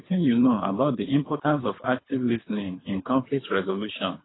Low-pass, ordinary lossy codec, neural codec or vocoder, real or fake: 7.2 kHz; AAC, 16 kbps; codec, 24 kHz, 3 kbps, HILCodec; fake